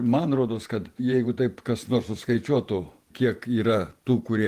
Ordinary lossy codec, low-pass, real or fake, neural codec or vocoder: Opus, 24 kbps; 14.4 kHz; fake; vocoder, 48 kHz, 128 mel bands, Vocos